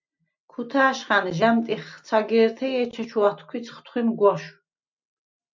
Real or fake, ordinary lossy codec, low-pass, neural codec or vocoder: real; MP3, 64 kbps; 7.2 kHz; none